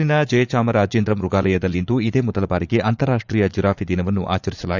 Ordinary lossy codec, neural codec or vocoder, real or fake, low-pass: none; vocoder, 44.1 kHz, 80 mel bands, Vocos; fake; 7.2 kHz